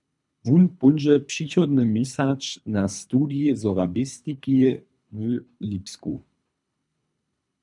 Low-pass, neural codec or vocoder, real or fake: 10.8 kHz; codec, 24 kHz, 3 kbps, HILCodec; fake